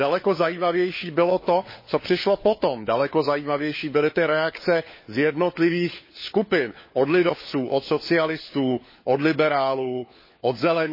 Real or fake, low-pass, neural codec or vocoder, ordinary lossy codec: fake; 5.4 kHz; codec, 16 kHz, 4 kbps, FunCodec, trained on LibriTTS, 50 frames a second; MP3, 24 kbps